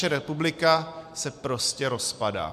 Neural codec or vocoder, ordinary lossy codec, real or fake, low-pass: none; AAC, 96 kbps; real; 14.4 kHz